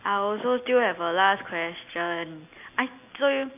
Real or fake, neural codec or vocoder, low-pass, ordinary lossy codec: real; none; 3.6 kHz; AAC, 32 kbps